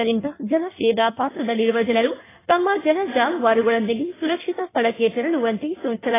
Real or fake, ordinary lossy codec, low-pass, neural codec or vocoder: fake; AAC, 16 kbps; 3.6 kHz; codec, 16 kHz in and 24 kHz out, 1.1 kbps, FireRedTTS-2 codec